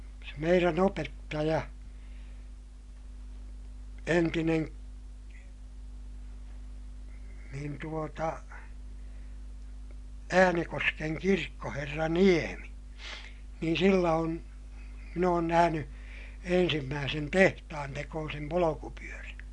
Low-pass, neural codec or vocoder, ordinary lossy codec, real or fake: 10.8 kHz; none; MP3, 64 kbps; real